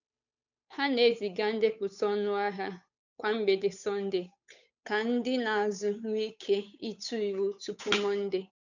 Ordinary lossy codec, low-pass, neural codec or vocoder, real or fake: none; 7.2 kHz; codec, 16 kHz, 8 kbps, FunCodec, trained on Chinese and English, 25 frames a second; fake